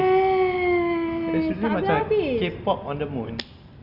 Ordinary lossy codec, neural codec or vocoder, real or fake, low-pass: Opus, 64 kbps; none; real; 5.4 kHz